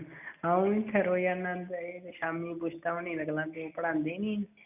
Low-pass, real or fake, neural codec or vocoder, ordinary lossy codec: 3.6 kHz; real; none; none